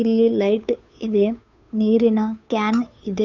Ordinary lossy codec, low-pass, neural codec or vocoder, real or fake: none; 7.2 kHz; codec, 16 kHz, 2 kbps, FunCodec, trained on Chinese and English, 25 frames a second; fake